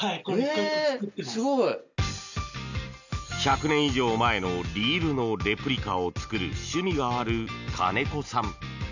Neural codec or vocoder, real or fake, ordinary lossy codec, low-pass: none; real; none; 7.2 kHz